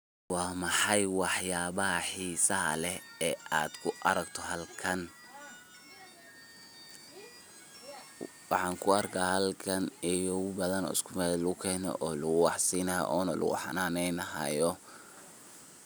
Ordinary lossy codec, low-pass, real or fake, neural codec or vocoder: none; none; real; none